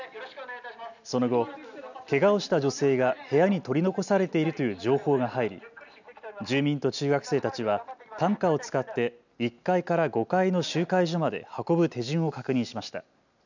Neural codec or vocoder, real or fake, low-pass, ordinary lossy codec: none; real; 7.2 kHz; none